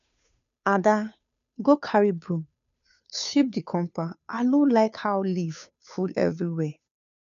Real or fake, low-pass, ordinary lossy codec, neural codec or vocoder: fake; 7.2 kHz; none; codec, 16 kHz, 2 kbps, FunCodec, trained on Chinese and English, 25 frames a second